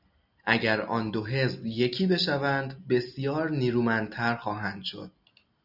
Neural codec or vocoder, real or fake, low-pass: none; real; 5.4 kHz